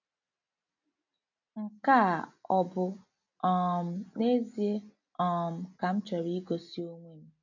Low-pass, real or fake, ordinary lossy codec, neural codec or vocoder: 7.2 kHz; real; none; none